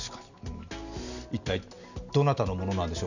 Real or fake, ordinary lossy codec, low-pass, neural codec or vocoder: real; none; 7.2 kHz; none